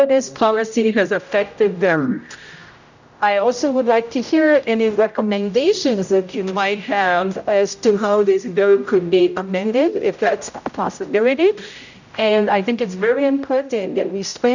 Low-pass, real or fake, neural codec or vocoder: 7.2 kHz; fake; codec, 16 kHz, 0.5 kbps, X-Codec, HuBERT features, trained on general audio